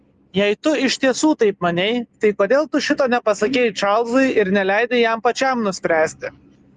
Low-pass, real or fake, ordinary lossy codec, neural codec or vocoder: 10.8 kHz; real; Opus, 32 kbps; none